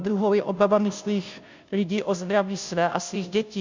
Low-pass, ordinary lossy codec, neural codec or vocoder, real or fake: 7.2 kHz; MP3, 64 kbps; codec, 16 kHz, 0.5 kbps, FunCodec, trained on Chinese and English, 25 frames a second; fake